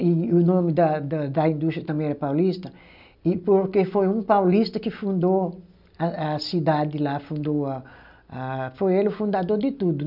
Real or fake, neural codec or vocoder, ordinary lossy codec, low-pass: real; none; none; 5.4 kHz